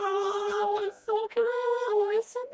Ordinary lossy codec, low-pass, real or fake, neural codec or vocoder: none; none; fake; codec, 16 kHz, 1 kbps, FreqCodec, smaller model